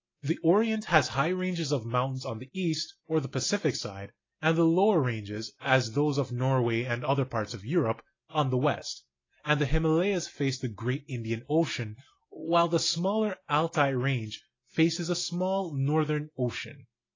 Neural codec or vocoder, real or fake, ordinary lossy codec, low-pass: none; real; AAC, 32 kbps; 7.2 kHz